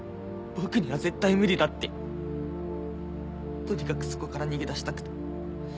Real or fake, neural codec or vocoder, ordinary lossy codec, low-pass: real; none; none; none